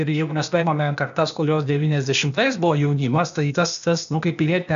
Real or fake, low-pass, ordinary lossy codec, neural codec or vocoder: fake; 7.2 kHz; MP3, 96 kbps; codec, 16 kHz, 0.8 kbps, ZipCodec